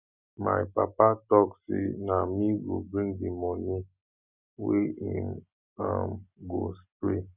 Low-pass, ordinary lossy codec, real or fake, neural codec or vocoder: 3.6 kHz; none; real; none